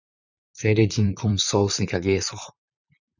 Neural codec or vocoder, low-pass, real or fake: vocoder, 44.1 kHz, 128 mel bands, Pupu-Vocoder; 7.2 kHz; fake